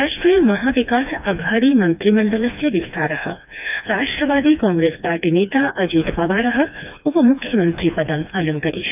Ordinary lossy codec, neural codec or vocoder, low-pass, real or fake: none; codec, 16 kHz, 2 kbps, FreqCodec, smaller model; 3.6 kHz; fake